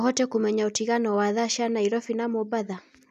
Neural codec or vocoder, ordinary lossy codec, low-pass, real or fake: none; none; 14.4 kHz; real